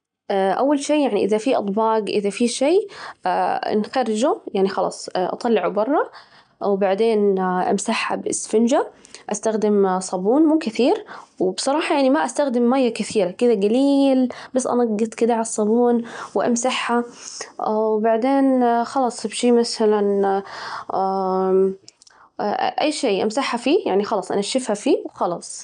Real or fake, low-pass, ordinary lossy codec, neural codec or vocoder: real; 9.9 kHz; none; none